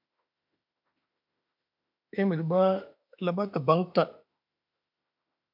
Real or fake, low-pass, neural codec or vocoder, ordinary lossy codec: fake; 5.4 kHz; autoencoder, 48 kHz, 32 numbers a frame, DAC-VAE, trained on Japanese speech; MP3, 48 kbps